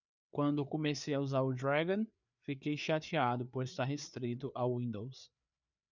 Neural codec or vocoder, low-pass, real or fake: codec, 16 kHz, 4 kbps, FreqCodec, larger model; 7.2 kHz; fake